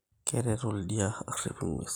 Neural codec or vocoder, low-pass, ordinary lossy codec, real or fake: none; none; none; real